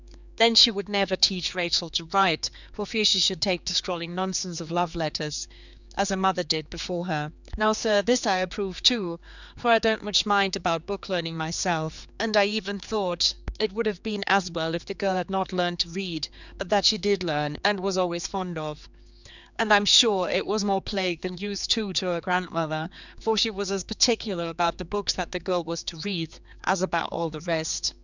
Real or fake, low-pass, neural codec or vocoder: fake; 7.2 kHz; codec, 16 kHz, 4 kbps, X-Codec, HuBERT features, trained on general audio